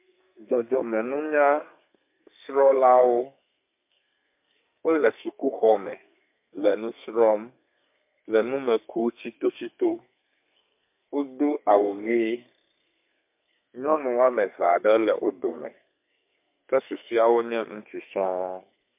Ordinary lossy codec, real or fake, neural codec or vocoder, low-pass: MP3, 32 kbps; fake; codec, 32 kHz, 1.9 kbps, SNAC; 3.6 kHz